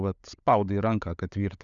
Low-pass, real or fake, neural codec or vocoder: 7.2 kHz; fake; codec, 16 kHz, 16 kbps, FunCodec, trained on LibriTTS, 50 frames a second